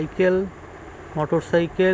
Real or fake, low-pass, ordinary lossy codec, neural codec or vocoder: real; none; none; none